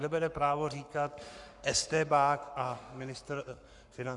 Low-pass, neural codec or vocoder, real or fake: 10.8 kHz; codec, 44.1 kHz, 7.8 kbps, Pupu-Codec; fake